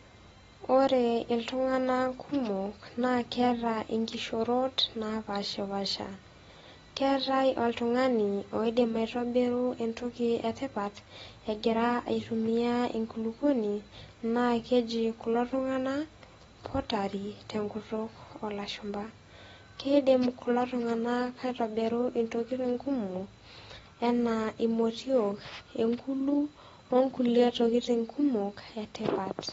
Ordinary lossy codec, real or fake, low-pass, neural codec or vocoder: AAC, 24 kbps; real; 19.8 kHz; none